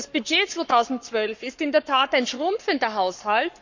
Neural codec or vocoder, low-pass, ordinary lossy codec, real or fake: codec, 44.1 kHz, 7.8 kbps, Pupu-Codec; 7.2 kHz; none; fake